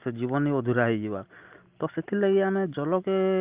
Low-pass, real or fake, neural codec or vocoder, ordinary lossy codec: 3.6 kHz; real; none; Opus, 24 kbps